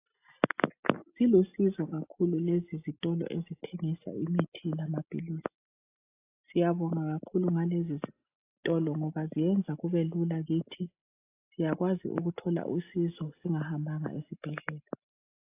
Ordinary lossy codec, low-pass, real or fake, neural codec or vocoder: AAC, 24 kbps; 3.6 kHz; real; none